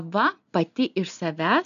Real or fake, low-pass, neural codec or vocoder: real; 7.2 kHz; none